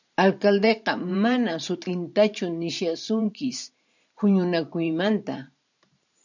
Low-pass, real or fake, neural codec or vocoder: 7.2 kHz; fake; vocoder, 44.1 kHz, 128 mel bands every 512 samples, BigVGAN v2